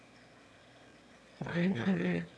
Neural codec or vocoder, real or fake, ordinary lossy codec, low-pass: autoencoder, 22.05 kHz, a latent of 192 numbers a frame, VITS, trained on one speaker; fake; none; none